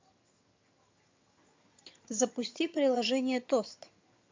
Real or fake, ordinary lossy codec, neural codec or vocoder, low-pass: fake; MP3, 48 kbps; vocoder, 22.05 kHz, 80 mel bands, HiFi-GAN; 7.2 kHz